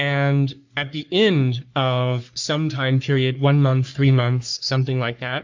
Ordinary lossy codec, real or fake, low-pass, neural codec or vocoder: MP3, 64 kbps; fake; 7.2 kHz; codec, 44.1 kHz, 3.4 kbps, Pupu-Codec